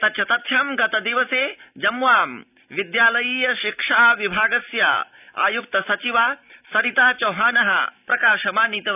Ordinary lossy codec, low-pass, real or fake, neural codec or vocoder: none; 3.6 kHz; real; none